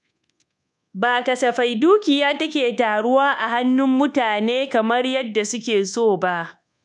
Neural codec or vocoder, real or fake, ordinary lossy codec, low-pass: codec, 24 kHz, 1.2 kbps, DualCodec; fake; none; 10.8 kHz